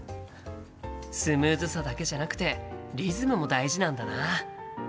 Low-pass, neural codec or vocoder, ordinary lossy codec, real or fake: none; none; none; real